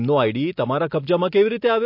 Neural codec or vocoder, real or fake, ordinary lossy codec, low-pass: none; real; MP3, 32 kbps; 5.4 kHz